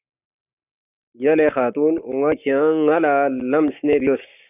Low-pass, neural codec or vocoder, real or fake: 3.6 kHz; codec, 44.1 kHz, 7.8 kbps, Pupu-Codec; fake